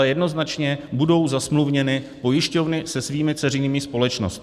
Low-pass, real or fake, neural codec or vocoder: 14.4 kHz; real; none